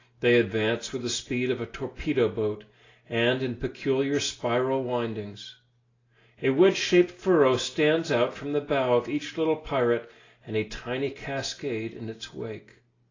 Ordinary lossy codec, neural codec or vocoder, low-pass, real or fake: AAC, 32 kbps; none; 7.2 kHz; real